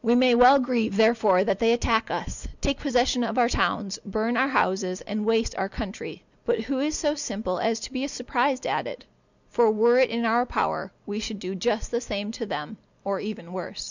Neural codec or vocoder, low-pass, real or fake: none; 7.2 kHz; real